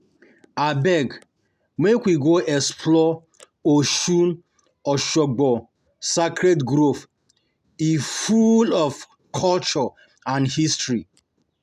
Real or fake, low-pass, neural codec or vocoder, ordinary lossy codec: real; 14.4 kHz; none; none